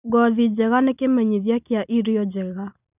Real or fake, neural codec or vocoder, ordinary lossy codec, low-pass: real; none; none; 3.6 kHz